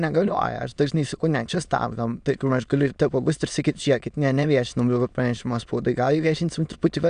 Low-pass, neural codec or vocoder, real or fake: 9.9 kHz; autoencoder, 22.05 kHz, a latent of 192 numbers a frame, VITS, trained on many speakers; fake